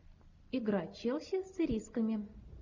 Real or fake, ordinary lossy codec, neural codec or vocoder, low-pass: real; Opus, 64 kbps; none; 7.2 kHz